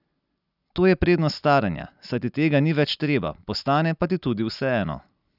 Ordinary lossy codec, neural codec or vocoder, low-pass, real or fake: none; none; 5.4 kHz; real